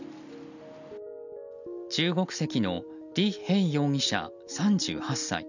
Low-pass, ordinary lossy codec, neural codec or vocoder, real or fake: 7.2 kHz; none; none; real